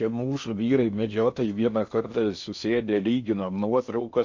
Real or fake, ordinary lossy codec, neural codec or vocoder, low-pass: fake; MP3, 64 kbps; codec, 16 kHz in and 24 kHz out, 0.8 kbps, FocalCodec, streaming, 65536 codes; 7.2 kHz